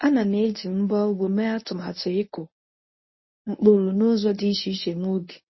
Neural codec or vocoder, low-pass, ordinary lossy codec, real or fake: codec, 24 kHz, 0.9 kbps, WavTokenizer, medium speech release version 1; 7.2 kHz; MP3, 24 kbps; fake